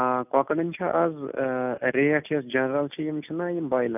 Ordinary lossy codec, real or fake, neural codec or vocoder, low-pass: none; real; none; 3.6 kHz